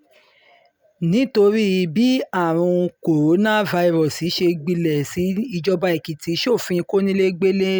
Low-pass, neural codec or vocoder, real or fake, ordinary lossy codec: none; none; real; none